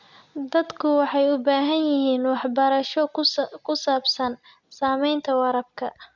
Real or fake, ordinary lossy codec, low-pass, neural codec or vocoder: real; Opus, 64 kbps; 7.2 kHz; none